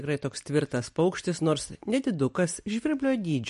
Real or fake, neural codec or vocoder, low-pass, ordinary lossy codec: real; none; 14.4 kHz; MP3, 48 kbps